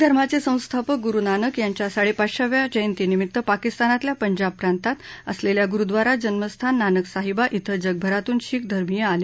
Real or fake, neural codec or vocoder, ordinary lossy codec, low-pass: real; none; none; none